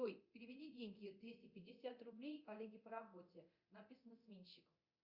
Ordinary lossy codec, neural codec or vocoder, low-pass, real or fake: Opus, 64 kbps; codec, 24 kHz, 0.9 kbps, DualCodec; 5.4 kHz; fake